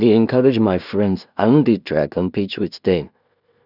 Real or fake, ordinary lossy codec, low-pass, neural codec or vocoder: fake; none; 5.4 kHz; codec, 16 kHz in and 24 kHz out, 0.4 kbps, LongCat-Audio-Codec, two codebook decoder